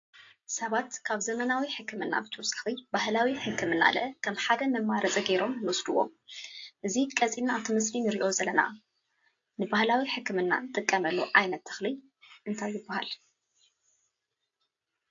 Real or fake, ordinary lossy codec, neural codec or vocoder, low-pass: real; AAC, 48 kbps; none; 7.2 kHz